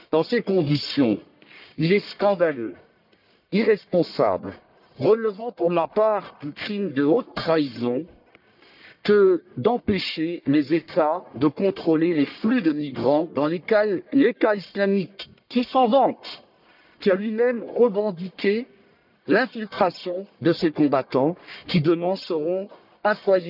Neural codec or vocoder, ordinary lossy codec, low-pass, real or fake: codec, 44.1 kHz, 1.7 kbps, Pupu-Codec; none; 5.4 kHz; fake